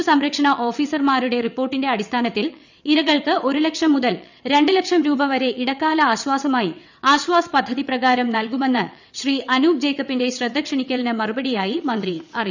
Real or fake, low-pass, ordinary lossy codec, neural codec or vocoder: fake; 7.2 kHz; none; vocoder, 22.05 kHz, 80 mel bands, WaveNeXt